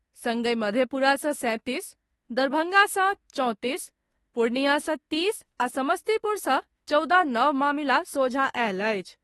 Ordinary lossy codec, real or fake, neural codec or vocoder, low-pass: AAC, 32 kbps; fake; autoencoder, 48 kHz, 32 numbers a frame, DAC-VAE, trained on Japanese speech; 19.8 kHz